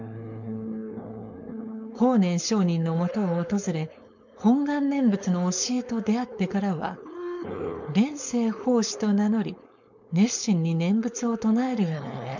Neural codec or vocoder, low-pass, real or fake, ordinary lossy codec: codec, 16 kHz, 4.8 kbps, FACodec; 7.2 kHz; fake; none